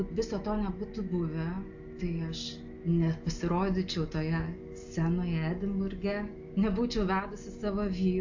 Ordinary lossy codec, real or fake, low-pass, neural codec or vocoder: AAC, 48 kbps; real; 7.2 kHz; none